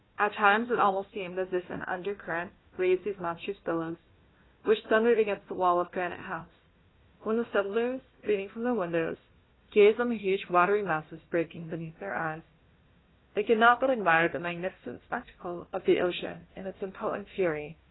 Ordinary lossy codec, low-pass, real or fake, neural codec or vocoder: AAC, 16 kbps; 7.2 kHz; fake; codec, 16 kHz, 1 kbps, FunCodec, trained on Chinese and English, 50 frames a second